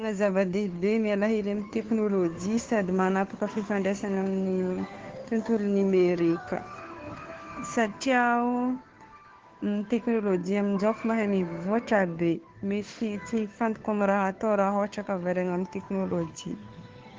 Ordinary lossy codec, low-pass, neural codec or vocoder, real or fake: Opus, 16 kbps; 7.2 kHz; codec, 16 kHz, 2 kbps, FunCodec, trained on Chinese and English, 25 frames a second; fake